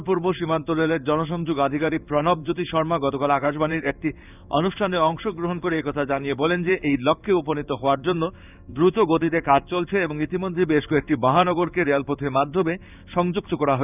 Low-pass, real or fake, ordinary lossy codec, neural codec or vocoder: 3.6 kHz; fake; none; codec, 16 kHz in and 24 kHz out, 1 kbps, XY-Tokenizer